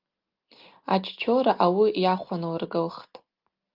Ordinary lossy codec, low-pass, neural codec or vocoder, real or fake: Opus, 32 kbps; 5.4 kHz; none; real